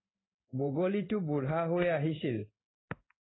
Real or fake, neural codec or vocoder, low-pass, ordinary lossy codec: fake; codec, 16 kHz in and 24 kHz out, 1 kbps, XY-Tokenizer; 7.2 kHz; AAC, 16 kbps